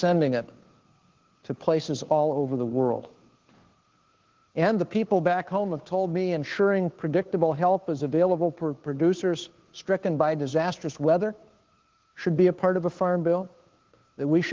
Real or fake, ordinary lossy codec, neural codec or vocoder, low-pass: fake; Opus, 16 kbps; codec, 16 kHz, 2 kbps, FunCodec, trained on Chinese and English, 25 frames a second; 7.2 kHz